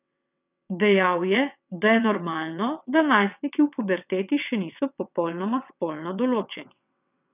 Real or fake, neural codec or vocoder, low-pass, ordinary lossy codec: fake; vocoder, 22.05 kHz, 80 mel bands, WaveNeXt; 3.6 kHz; none